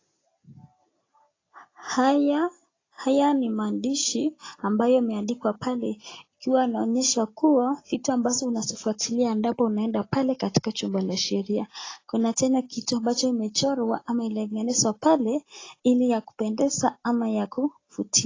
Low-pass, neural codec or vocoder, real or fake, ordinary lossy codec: 7.2 kHz; none; real; AAC, 32 kbps